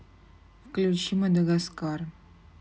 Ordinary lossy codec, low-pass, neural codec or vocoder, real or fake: none; none; none; real